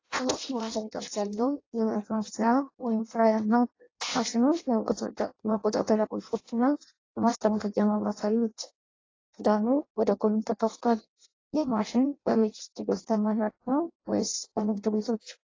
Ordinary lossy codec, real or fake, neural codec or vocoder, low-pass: AAC, 32 kbps; fake; codec, 16 kHz in and 24 kHz out, 0.6 kbps, FireRedTTS-2 codec; 7.2 kHz